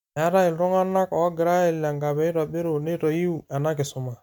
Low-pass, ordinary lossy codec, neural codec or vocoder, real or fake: 19.8 kHz; MP3, 96 kbps; none; real